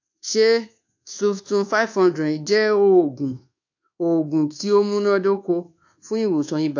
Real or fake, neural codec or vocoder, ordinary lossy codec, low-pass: fake; codec, 24 kHz, 1.2 kbps, DualCodec; AAC, 48 kbps; 7.2 kHz